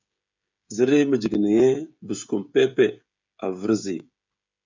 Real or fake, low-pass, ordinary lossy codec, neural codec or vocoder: fake; 7.2 kHz; MP3, 64 kbps; codec, 16 kHz, 16 kbps, FreqCodec, smaller model